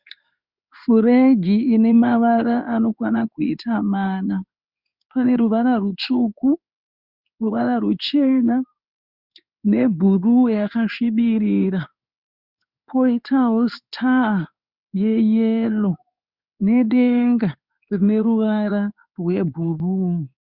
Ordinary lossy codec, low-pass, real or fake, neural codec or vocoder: Opus, 64 kbps; 5.4 kHz; fake; codec, 16 kHz in and 24 kHz out, 1 kbps, XY-Tokenizer